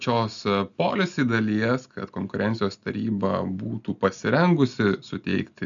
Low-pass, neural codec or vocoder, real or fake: 7.2 kHz; none; real